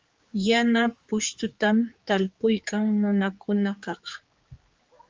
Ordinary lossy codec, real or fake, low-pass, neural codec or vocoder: Opus, 32 kbps; fake; 7.2 kHz; codec, 16 kHz, 4 kbps, X-Codec, HuBERT features, trained on general audio